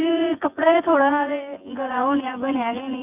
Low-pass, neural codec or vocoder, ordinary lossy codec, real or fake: 3.6 kHz; vocoder, 24 kHz, 100 mel bands, Vocos; none; fake